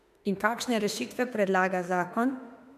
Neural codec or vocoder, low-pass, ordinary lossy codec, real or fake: autoencoder, 48 kHz, 32 numbers a frame, DAC-VAE, trained on Japanese speech; 14.4 kHz; none; fake